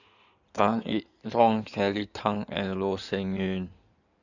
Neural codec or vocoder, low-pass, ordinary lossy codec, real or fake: codec, 16 kHz in and 24 kHz out, 2.2 kbps, FireRedTTS-2 codec; 7.2 kHz; none; fake